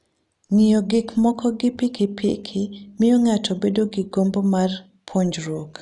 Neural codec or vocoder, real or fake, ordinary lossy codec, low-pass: none; real; none; 10.8 kHz